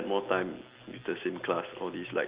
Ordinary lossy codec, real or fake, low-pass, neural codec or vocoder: Opus, 32 kbps; real; 3.6 kHz; none